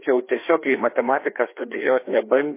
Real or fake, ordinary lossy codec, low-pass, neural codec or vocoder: fake; MP3, 24 kbps; 3.6 kHz; codec, 16 kHz, 2 kbps, FreqCodec, larger model